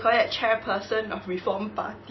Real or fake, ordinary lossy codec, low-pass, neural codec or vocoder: real; MP3, 24 kbps; 7.2 kHz; none